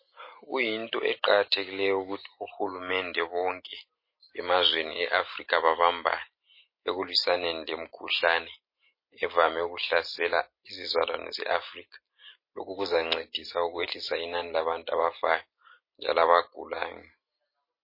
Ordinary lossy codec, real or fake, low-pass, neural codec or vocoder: MP3, 24 kbps; real; 5.4 kHz; none